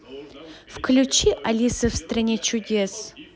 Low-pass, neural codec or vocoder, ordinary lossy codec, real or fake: none; none; none; real